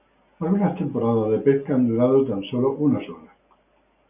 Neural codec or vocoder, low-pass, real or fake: none; 3.6 kHz; real